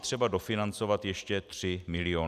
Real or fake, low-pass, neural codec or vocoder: real; 14.4 kHz; none